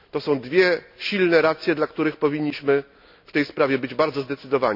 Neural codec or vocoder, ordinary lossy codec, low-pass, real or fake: none; none; 5.4 kHz; real